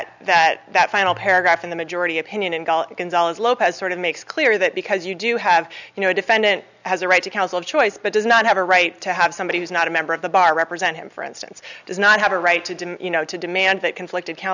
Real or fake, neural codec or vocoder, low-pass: real; none; 7.2 kHz